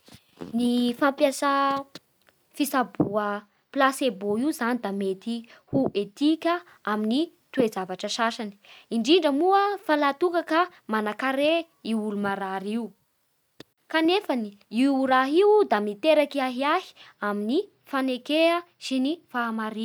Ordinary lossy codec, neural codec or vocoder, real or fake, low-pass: none; none; real; none